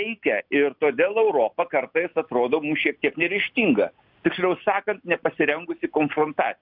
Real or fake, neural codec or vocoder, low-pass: real; none; 5.4 kHz